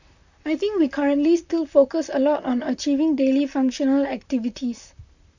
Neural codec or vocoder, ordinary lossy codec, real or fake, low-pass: vocoder, 44.1 kHz, 128 mel bands, Pupu-Vocoder; none; fake; 7.2 kHz